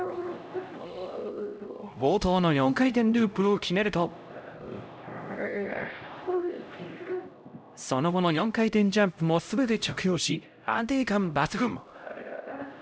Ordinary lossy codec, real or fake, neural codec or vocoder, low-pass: none; fake; codec, 16 kHz, 0.5 kbps, X-Codec, HuBERT features, trained on LibriSpeech; none